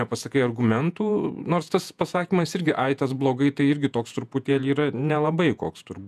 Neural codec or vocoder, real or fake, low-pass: vocoder, 48 kHz, 128 mel bands, Vocos; fake; 14.4 kHz